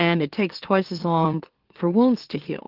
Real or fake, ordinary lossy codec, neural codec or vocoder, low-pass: fake; Opus, 16 kbps; autoencoder, 44.1 kHz, a latent of 192 numbers a frame, MeloTTS; 5.4 kHz